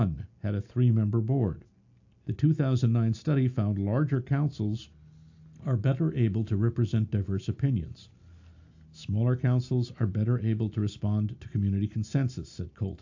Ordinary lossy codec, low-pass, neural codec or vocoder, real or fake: AAC, 48 kbps; 7.2 kHz; none; real